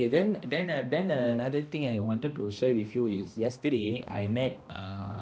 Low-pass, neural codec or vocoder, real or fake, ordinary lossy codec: none; codec, 16 kHz, 1 kbps, X-Codec, HuBERT features, trained on balanced general audio; fake; none